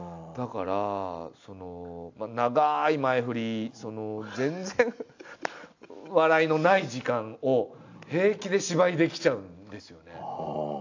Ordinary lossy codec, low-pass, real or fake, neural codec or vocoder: AAC, 48 kbps; 7.2 kHz; real; none